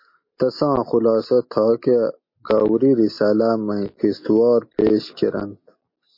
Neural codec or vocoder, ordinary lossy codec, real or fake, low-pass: none; MP3, 32 kbps; real; 5.4 kHz